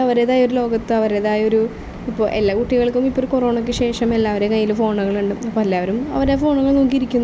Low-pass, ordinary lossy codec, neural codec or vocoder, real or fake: none; none; none; real